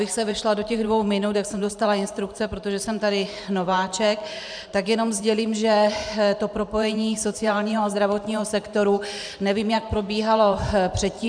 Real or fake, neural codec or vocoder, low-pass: fake; vocoder, 44.1 kHz, 128 mel bands every 512 samples, BigVGAN v2; 9.9 kHz